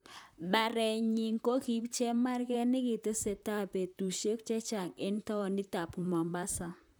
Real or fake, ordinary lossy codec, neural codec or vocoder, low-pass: fake; none; vocoder, 44.1 kHz, 128 mel bands, Pupu-Vocoder; none